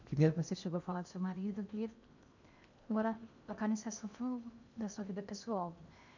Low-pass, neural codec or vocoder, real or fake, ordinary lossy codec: 7.2 kHz; codec, 16 kHz in and 24 kHz out, 0.8 kbps, FocalCodec, streaming, 65536 codes; fake; none